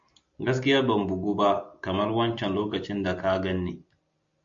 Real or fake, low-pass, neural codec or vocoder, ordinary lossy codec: real; 7.2 kHz; none; MP3, 48 kbps